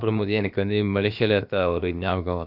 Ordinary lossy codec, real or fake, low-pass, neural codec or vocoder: none; fake; 5.4 kHz; codec, 16 kHz, about 1 kbps, DyCAST, with the encoder's durations